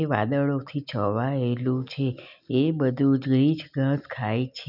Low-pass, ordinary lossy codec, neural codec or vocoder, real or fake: 5.4 kHz; none; none; real